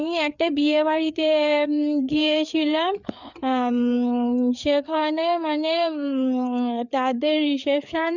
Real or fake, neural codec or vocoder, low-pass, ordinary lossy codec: fake; codec, 16 kHz, 4 kbps, FreqCodec, larger model; 7.2 kHz; none